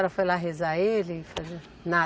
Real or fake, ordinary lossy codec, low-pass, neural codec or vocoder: real; none; none; none